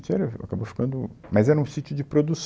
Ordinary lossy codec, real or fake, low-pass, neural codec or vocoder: none; real; none; none